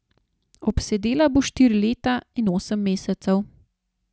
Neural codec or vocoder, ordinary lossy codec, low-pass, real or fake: none; none; none; real